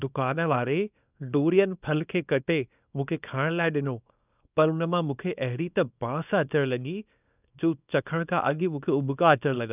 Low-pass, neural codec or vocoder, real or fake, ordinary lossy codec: 3.6 kHz; codec, 24 kHz, 0.9 kbps, WavTokenizer, small release; fake; none